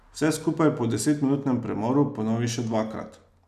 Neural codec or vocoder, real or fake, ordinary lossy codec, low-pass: none; real; none; 14.4 kHz